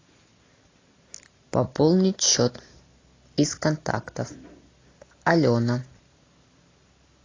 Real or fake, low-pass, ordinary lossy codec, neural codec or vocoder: real; 7.2 kHz; AAC, 32 kbps; none